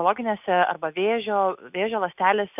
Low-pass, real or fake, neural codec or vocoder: 3.6 kHz; real; none